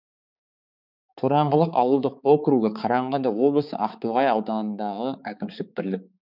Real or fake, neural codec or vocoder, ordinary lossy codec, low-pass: fake; codec, 16 kHz, 4 kbps, X-Codec, HuBERT features, trained on balanced general audio; none; 5.4 kHz